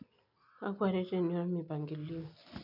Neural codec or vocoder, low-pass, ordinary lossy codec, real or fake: none; 5.4 kHz; none; real